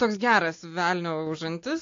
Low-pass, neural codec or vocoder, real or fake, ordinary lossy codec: 7.2 kHz; none; real; AAC, 48 kbps